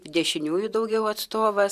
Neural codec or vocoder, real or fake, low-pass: vocoder, 44.1 kHz, 128 mel bands every 512 samples, BigVGAN v2; fake; 14.4 kHz